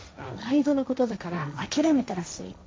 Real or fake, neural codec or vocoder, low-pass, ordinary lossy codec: fake; codec, 16 kHz, 1.1 kbps, Voila-Tokenizer; none; none